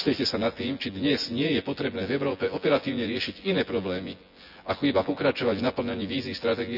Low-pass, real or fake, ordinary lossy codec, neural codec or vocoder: 5.4 kHz; fake; none; vocoder, 24 kHz, 100 mel bands, Vocos